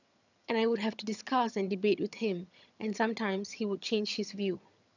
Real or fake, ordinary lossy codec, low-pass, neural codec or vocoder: fake; none; 7.2 kHz; vocoder, 22.05 kHz, 80 mel bands, HiFi-GAN